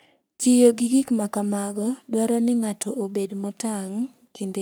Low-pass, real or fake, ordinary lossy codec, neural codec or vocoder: none; fake; none; codec, 44.1 kHz, 3.4 kbps, Pupu-Codec